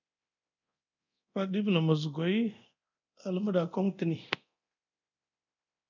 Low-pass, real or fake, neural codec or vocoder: 7.2 kHz; fake; codec, 24 kHz, 0.9 kbps, DualCodec